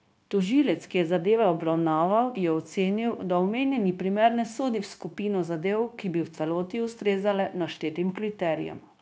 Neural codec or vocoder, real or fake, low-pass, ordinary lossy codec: codec, 16 kHz, 0.9 kbps, LongCat-Audio-Codec; fake; none; none